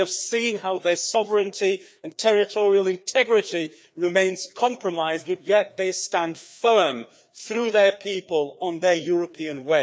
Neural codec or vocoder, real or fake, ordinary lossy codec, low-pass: codec, 16 kHz, 2 kbps, FreqCodec, larger model; fake; none; none